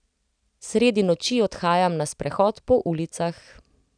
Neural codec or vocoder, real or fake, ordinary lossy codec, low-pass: none; real; none; 9.9 kHz